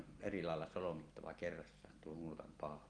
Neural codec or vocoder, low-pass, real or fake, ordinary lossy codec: none; 9.9 kHz; real; Opus, 24 kbps